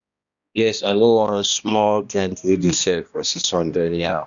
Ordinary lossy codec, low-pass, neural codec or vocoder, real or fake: none; 7.2 kHz; codec, 16 kHz, 1 kbps, X-Codec, HuBERT features, trained on balanced general audio; fake